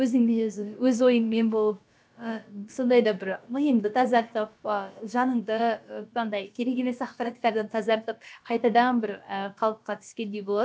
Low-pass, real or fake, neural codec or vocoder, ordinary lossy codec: none; fake; codec, 16 kHz, about 1 kbps, DyCAST, with the encoder's durations; none